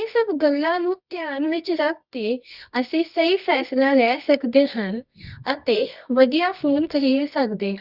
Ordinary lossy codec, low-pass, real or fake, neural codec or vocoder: Opus, 64 kbps; 5.4 kHz; fake; codec, 24 kHz, 0.9 kbps, WavTokenizer, medium music audio release